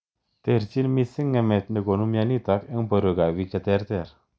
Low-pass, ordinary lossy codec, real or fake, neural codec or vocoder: none; none; real; none